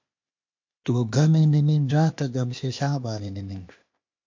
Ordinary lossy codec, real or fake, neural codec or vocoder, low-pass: MP3, 48 kbps; fake; codec, 16 kHz, 0.8 kbps, ZipCodec; 7.2 kHz